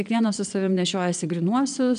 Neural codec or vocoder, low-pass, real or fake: vocoder, 22.05 kHz, 80 mel bands, Vocos; 9.9 kHz; fake